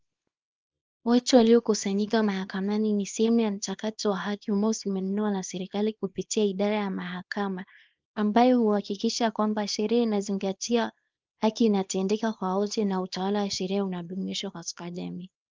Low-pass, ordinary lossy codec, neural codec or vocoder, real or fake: 7.2 kHz; Opus, 24 kbps; codec, 24 kHz, 0.9 kbps, WavTokenizer, small release; fake